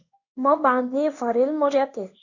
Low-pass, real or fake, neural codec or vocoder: 7.2 kHz; fake; codec, 16 kHz in and 24 kHz out, 1 kbps, XY-Tokenizer